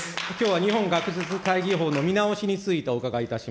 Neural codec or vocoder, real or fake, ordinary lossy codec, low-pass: none; real; none; none